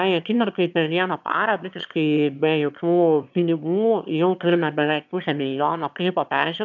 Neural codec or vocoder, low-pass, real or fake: autoencoder, 22.05 kHz, a latent of 192 numbers a frame, VITS, trained on one speaker; 7.2 kHz; fake